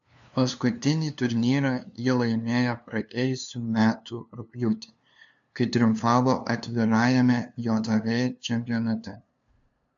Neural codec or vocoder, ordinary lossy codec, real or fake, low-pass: codec, 16 kHz, 2 kbps, FunCodec, trained on LibriTTS, 25 frames a second; AAC, 64 kbps; fake; 7.2 kHz